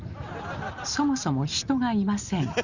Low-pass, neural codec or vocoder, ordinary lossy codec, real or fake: 7.2 kHz; none; none; real